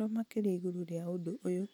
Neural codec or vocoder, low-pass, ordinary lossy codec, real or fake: none; 19.8 kHz; none; real